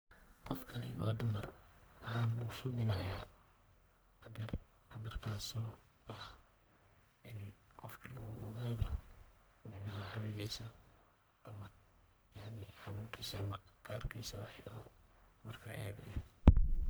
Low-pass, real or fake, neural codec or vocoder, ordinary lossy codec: none; fake; codec, 44.1 kHz, 1.7 kbps, Pupu-Codec; none